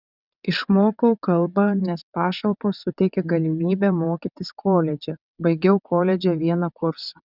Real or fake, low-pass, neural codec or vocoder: fake; 5.4 kHz; vocoder, 22.05 kHz, 80 mel bands, WaveNeXt